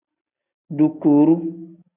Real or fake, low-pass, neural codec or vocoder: real; 3.6 kHz; none